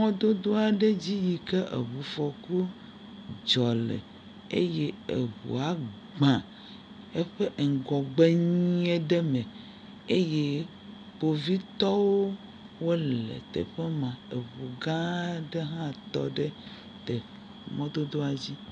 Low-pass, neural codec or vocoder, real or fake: 9.9 kHz; none; real